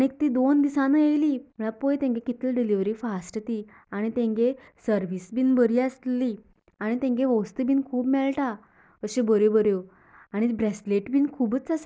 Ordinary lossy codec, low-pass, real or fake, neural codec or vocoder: none; none; real; none